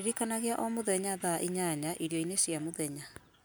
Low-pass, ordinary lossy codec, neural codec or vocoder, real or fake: none; none; none; real